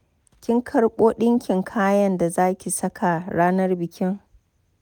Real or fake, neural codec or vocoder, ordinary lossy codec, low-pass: real; none; none; none